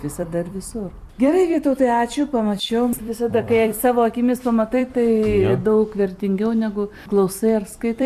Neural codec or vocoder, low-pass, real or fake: vocoder, 44.1 kHz, 128 mel bands every 256 samples, BigVGAN v2; 14.4 kHz; fake